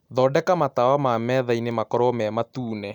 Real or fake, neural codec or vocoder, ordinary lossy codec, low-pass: real; none; none; 19.8 kHz